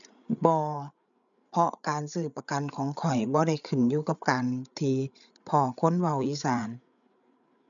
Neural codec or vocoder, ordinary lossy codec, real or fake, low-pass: codec, 16 kHz, 8 kbps, FreqCodec, larger model; none; fake; 7.2 kHz